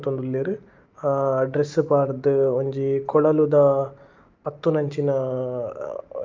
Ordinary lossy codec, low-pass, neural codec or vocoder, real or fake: Opus, 24 kbps; 7.2 kHz; none; real